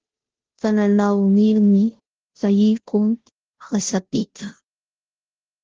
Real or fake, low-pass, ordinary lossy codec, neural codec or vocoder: fake; 7.2 kHz; Opus, 16 kbps; codec, 16 kHz, 0.5 kbps, FunCodec, trained on Chinese and English, 25 frames a second